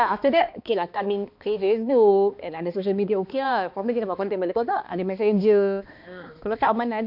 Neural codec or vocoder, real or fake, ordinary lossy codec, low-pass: codec, 16 kHz, 2 kbps, X-Codec, HuBERT features, trained on balanced general audio; fake; MP3, 48 kbps; 5.4 kHz